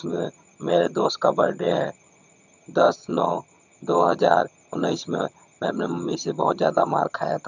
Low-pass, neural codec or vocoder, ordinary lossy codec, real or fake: 7.2 kHz; vocoder, 22.05 kHz, 80 mel bands, HiFi-GAN; none; fake